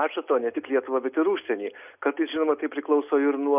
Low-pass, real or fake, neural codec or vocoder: 3.6 kHz; real; none